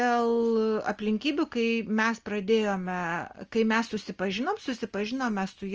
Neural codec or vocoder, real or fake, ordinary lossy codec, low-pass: none; real; Opus, 24 kbps; 7.2 kHz